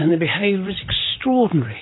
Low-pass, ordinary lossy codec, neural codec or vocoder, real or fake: 7.2 kHz; AAC, 16 kbps; vocoder, 44.1 kHz, 128 mel bands, Pupu-Vocoder; fake